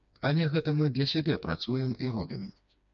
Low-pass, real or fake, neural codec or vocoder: 7.2 kHz; fake; codec, 16 kHz, 2 kbps, FreqCodec, smaller model